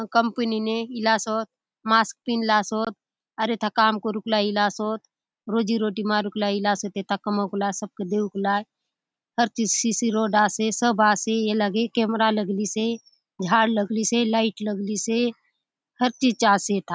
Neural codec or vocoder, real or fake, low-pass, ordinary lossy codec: none; real; none; none